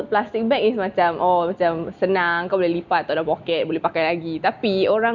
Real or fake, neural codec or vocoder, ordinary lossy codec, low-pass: real; none; none; 7.2 kHz